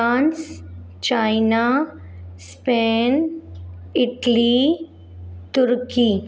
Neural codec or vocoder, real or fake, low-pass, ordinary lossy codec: none; real; none; none